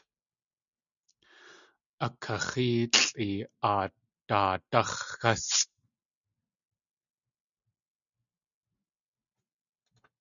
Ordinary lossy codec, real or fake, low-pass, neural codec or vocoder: MP3, 96 kbps; real; 7.2 kHz; none